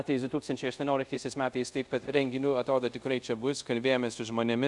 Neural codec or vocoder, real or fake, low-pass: codec, 24 kHz, 0.5 kbps, DualCodec; fake; 10.8 kHz